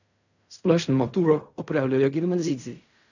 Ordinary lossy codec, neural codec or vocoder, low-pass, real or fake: none; codec, 16 kHz in and 24 kHz out, 0.4 kbps, LongCat-Audio-Codec, fine tuned four codebook decoder; 7.2 kHz; fake